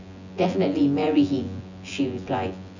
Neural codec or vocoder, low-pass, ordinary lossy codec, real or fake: vocoder, 24 kHz, 100 mel bands, Vocos; 7.2 kHz; none; fake